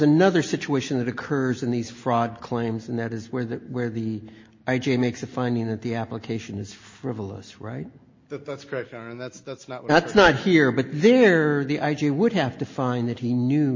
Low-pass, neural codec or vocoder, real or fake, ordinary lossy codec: 7.2 kHz; none; real; MP3, 32 kbps